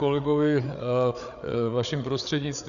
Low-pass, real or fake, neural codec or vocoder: 7.2 kHz; fake; codec, 16 kHz, 8 kbps, FreqCodec, larger model